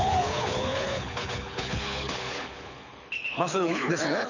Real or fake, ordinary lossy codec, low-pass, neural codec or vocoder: fake; none; 7.2 kHz; codec, 24 kHz, 6 kbps, HILCodec